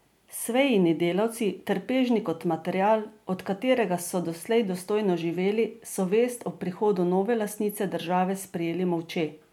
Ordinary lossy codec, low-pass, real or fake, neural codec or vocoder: MP3, 96 kbps; 19.8 kHz; real; none